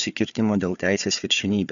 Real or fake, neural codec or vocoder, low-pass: fake; codec, 16 kHz, 4 kbps, FunCodec, trained on Chinese and English, 50 frames a second; 7.2 kHz